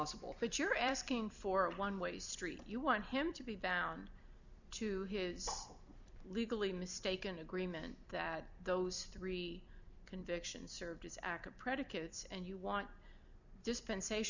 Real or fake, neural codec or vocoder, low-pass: fake; vocoder, 44.1 kHz, 128 mel bands every 256 samples, BigVGAN v2; 7.2 kHz